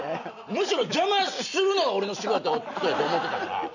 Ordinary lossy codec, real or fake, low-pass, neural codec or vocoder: none; real; 7.2 kHz; none